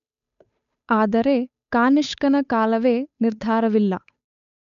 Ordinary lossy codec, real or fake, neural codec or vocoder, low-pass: none; fake; codec, 16 kHz, 8 kbps, FunCodec, trained on Chinese and English, 25 frames a second; 7.2 kHz